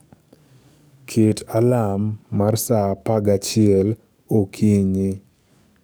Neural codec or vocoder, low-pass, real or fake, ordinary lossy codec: codec, 44.1 kHz, 7.8 kbps, DAC; none; fake; none